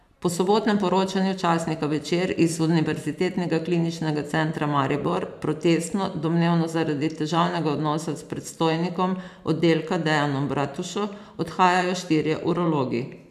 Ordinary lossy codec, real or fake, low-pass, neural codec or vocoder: none; fake; 14.4 kHz; vocoder, 44.1 kHz, 128 mel bands every 512 samples, BigVGAN v2